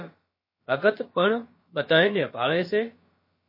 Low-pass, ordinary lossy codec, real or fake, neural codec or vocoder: 5.4 kHz; MP3, 24 kbps; fake; codec, 16 kHz, about 1 kbps, DyCAST, with the encoder's durations